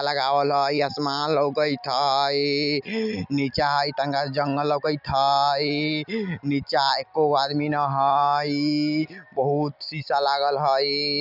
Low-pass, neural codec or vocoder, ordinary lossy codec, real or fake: 5.4 kHz; none; none; real